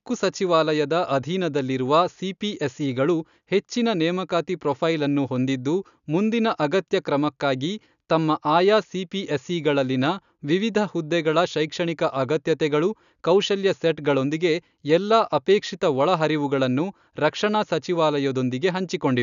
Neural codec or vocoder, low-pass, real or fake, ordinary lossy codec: none; 7.2 kHz; real; none